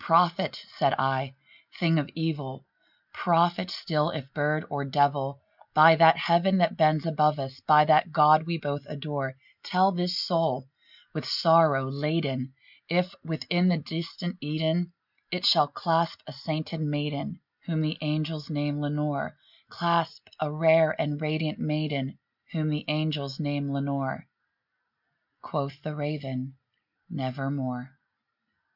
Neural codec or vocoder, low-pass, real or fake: none; 5.4 kHz; real